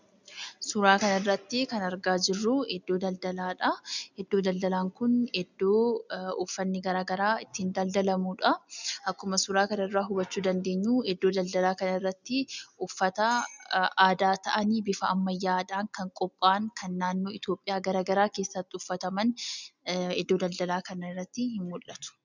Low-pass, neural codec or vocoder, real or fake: 7.2 kHz; none; real